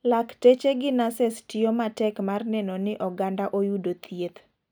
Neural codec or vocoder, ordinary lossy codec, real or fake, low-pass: none; none; real; none